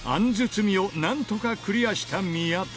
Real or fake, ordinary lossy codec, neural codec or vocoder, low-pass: real; none; none; none